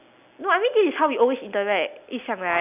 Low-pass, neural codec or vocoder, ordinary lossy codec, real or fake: 3.6 kHz; none; none; real